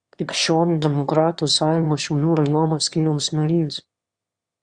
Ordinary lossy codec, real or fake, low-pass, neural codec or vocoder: Opus, 64 kbps; fake; 9.9 kHz; autoencoder, 22.05 kHz, a latent of 192 numbers a frame, VITS, trained on one speaker